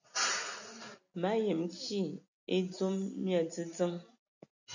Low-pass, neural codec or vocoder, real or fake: 7.2 kHz; none; real